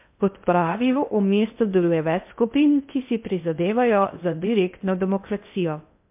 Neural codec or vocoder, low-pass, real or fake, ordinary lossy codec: codec, 16 kHz in and 24 kHz out, 0.6 kbps, FocalCodec, streaming, 2048 codes; 3.6 kHz; fake; MP3, 32 kbps